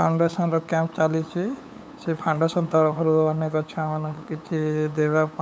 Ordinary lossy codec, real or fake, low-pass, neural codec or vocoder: none; fake; none; codec, 16 kHz, 8 kbps, FunCodec, trained on LibriTTS, 25 frames a second